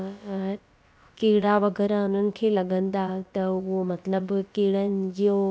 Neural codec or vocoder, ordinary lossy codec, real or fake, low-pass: codec, 16 kHz, about 1 kbps, DyCAST, with the encoder's durations; none; fake; none